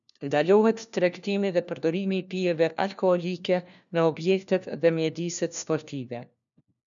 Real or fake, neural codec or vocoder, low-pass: fake; codec, 16 kHz, 1 kbps, FunCodec, trained on LibriTTS, 50 frames a second; 7.2 kHz